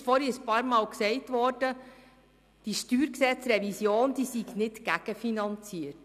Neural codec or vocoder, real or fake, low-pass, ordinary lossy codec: none; real; 14.4 kHz; none